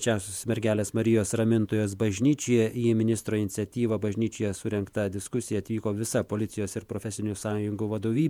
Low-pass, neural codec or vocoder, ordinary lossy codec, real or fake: 19.8 kHz; vocoder, 48 kHz, 128 mel bands, Vocos; MP3, 96 kbps; fake